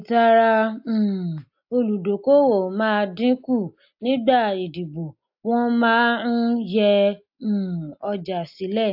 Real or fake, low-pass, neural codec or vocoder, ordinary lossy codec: real; 5.4 kHz; none; AAC, 48 kbps